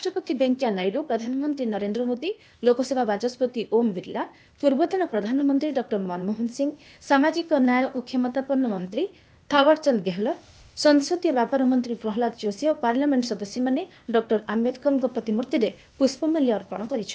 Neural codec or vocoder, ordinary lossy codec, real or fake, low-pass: codec, 16 kHz, 0.8 kbps, ZipCodec; none; fake; none